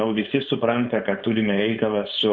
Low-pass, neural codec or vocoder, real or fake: 7.2 kHz; codec, 16 kHz, 4.8 kbps, FACodec; fake